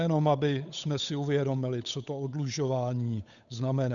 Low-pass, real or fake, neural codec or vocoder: 7.2 kHz; fake; codec, 16 kHz, 8 kbps, FunCodec, trained on Chinese and English, 25 frames a second